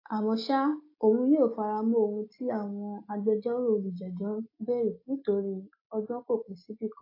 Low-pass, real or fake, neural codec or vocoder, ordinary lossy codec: 5.4 kHz; real; none; AAC, 32 kbps